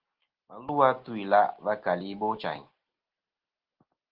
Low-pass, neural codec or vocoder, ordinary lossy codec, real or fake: 5.4 kHz; none; Opus, 16 kbps; real